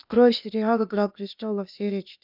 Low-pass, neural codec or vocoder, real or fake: 5.4 kHz; codec, 16 kHz, 0.8 kbps, ZipCodec; fake